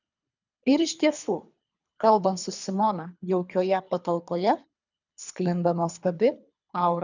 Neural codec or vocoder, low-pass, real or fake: codec, 24 kHz, 3 kbps, HILCodec; 7.2 kHz; fake